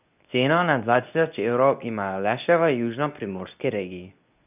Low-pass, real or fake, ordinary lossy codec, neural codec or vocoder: 3.6 kHz; fake; none; codec, 24 kHz, 0.9 kbps, WavTokenizer, medium speech release version 2